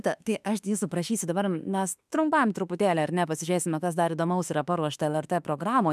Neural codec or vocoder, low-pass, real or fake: autoencoder, 48 kHz, 32 numbers a frame, DAC-VAE, trained on Japanese speech; 14.4 kHz; fake